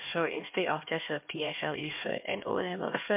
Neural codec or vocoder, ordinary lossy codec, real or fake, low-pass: codec, 16 kHz, 1 kbps, X-Codec, HuBERT features, trained on LibriSpeech; MP3, 32 kbps; fake; 3.6 kHz